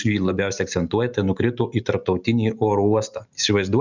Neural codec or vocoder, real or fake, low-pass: none; real; 7.2 kHz